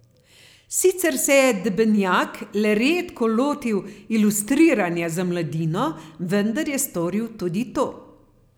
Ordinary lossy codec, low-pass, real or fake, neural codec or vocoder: none; none; real; none